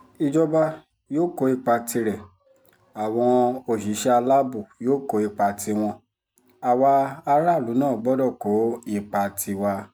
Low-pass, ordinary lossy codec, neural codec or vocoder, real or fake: none; none; none; real